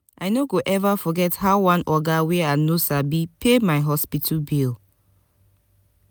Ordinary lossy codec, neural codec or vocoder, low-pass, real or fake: none; none; none; real